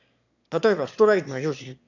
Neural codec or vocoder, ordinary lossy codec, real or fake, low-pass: autoencoder, 22.05 kHz, a latent of 192 numbers a frame, VITS, trained on one speaker; none; fake; 7.2 kHz